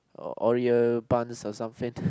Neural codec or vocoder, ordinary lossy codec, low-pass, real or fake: none; none; none; real